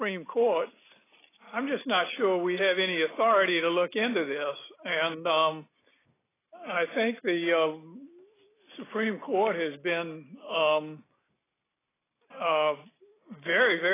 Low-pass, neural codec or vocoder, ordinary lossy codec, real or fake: 3.6 kHz; none; AAC, 16 kbps; real